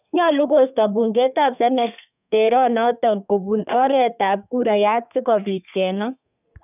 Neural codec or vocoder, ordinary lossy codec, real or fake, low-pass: codec, 32 kHz, 1.9 kbps, SNAC; none; fake; 3.6 kHz